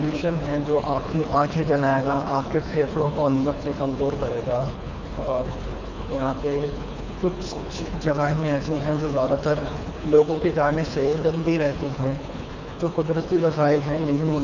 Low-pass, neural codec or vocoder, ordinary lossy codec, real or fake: 7.2 kHz; codec, 24 kHz, 3 kbps, HILCodec; none; fake